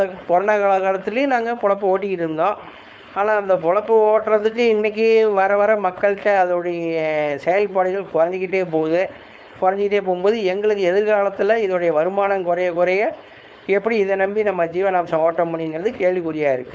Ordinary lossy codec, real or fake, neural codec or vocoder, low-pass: none; fake; codec, 16 kHz, 4.8 kbps, FACodec; none